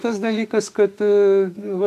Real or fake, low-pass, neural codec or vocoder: fake; 14.4 kHz; autoencoder, 48 kHz, 32 numbers a frame, DAC-VAE, trained on Japanese speech